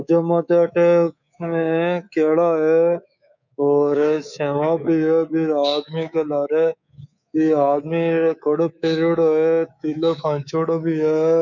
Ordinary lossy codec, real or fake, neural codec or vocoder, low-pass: none; fake; codec, 24 kHz, 3.1 kbps, DualCodec; 7.2 kHz